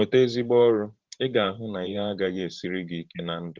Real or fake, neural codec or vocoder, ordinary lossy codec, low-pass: fake; vocoder, 44.1 kHz, 128 mel bands every 512 samples, BigVGAN v2; Opus, 16 kbps; 7.2 kHz